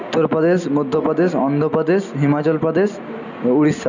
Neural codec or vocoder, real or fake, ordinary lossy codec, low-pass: none; real; none; 7.2 kHz